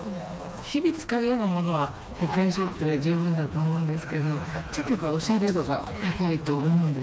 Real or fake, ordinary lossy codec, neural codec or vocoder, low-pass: fake; none; codec, 16 kHz, 2 kbps, FreqCodec, smaller model; none